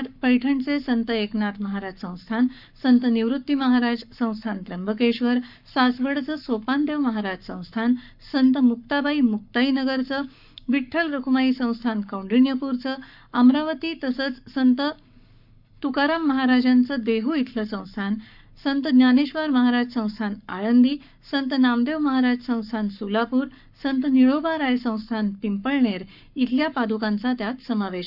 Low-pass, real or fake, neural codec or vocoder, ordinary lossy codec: 5.4 kHz; fake; codec, 44.1 kHz, 7.8 kbps, Pupu-Codec; none